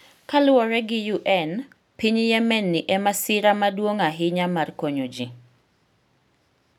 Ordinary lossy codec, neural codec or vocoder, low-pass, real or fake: none; none; 19.8 kHz; real